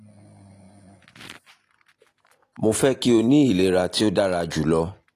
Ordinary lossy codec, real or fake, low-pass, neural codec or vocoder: AAC, 48 kbps; real; 14.4 kHz; none